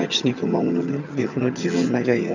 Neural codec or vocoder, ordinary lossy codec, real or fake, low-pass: vocoder, 22.05 kHz, 80 mel bands, HiFi-GAN; none; fake; 7.2 kHz